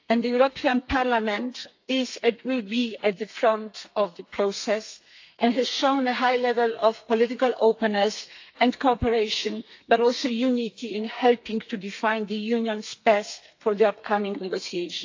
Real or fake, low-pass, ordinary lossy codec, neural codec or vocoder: fake; 7.2 kHz; AAC, 48 kbps; codec, 32 kHz, 1.9 kbps, SNAC